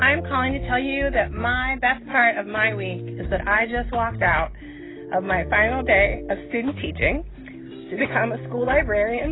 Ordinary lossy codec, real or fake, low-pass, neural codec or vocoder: AAC, 16 kbps; real; 7.2 kHz; none